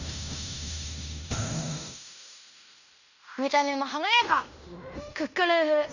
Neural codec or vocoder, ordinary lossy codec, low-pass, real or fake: codec, 16 kHz in and 24 kHz out, 0.9 kbps, LongCat-Audio-Codec, fine tuned four codebook decoder; none; 7.2 kHz; fake